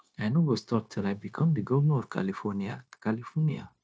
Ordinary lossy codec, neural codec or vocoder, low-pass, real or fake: none; codec, 16 kHz, 0.9 kbps, LongCat-Audio-Codec; none; fake